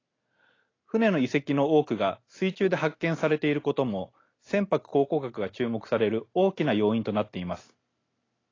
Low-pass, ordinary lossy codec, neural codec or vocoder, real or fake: 7.2 kHz; AAC, 32 kbps; none; real